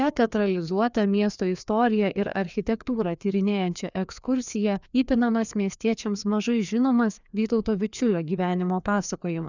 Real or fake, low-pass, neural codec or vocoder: fake; 7.2 kHz; codec, 16 kHz, 2 kbps, FreqCodec, larger model